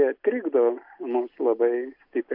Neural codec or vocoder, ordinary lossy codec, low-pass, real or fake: none; AAC, 32 kbps; 5.4 kHz; real